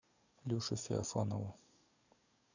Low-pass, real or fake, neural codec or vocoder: 7.2 kHz; fake; codec, 44.1 kHz, 7.8 kbps, DAC